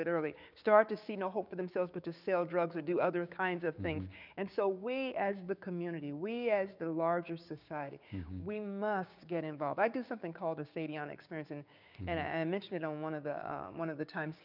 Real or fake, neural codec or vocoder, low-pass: fake; codec, 16 kHz, 6 kbps, DAC; 5.4 kHz